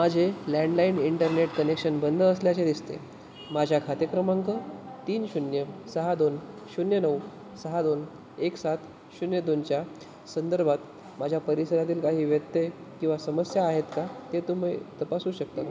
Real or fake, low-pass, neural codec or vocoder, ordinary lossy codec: real; none; none; none